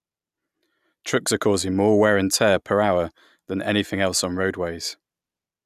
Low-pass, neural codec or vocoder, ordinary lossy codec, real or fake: 14.4 kHz; none; none; real